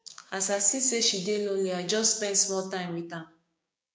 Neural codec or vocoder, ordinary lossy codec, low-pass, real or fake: codec, 16 kHz, 6 kbps, DAC; none; none; fake